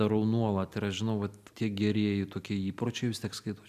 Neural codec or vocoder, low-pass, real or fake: none; 14.4 kHz; real